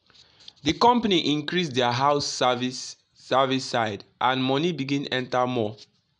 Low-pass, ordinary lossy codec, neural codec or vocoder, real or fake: 9.9 kHz; none; none; real